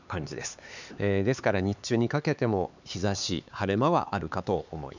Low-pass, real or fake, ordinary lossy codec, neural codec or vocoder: 7.2 kHz; fake; none; codec, 16 kHz, 4 kbps, X-Codec, HuBERT features, trained on LibriSpeech